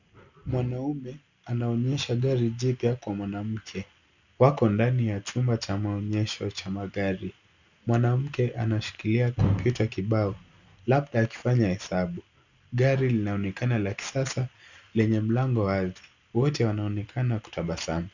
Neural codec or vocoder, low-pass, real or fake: none; 7.2 kHz; real